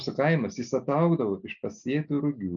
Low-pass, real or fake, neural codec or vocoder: 7.2 kHz; real; none